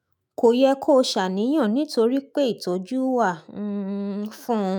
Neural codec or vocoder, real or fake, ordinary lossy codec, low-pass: autoencoder, 48 kHz, 128 numbers a frame, DAC-VAE, trained on Japanese speech; fake; none; none